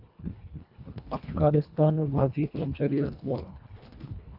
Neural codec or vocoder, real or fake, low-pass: codec, 24 kHz, 1.5 kbps, HILCodec; fake; 5.4 kHz